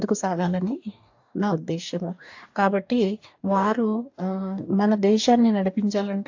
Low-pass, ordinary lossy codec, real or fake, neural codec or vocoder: 7.2 kHz; AAC, 48 kbps; fake; codec, 44.1 kHz, 2.6 kbps, DAC